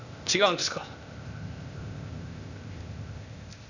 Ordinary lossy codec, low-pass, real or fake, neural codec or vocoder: none; 7.2 kHz; fake; codec, 16 kHz, 0.8 kbps, ZipCodec